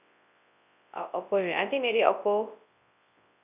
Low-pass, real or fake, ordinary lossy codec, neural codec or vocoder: 3.6 kHz; fake; none; codec, 24 kHz, 0.9 kbps, WavTokenizer, large speech release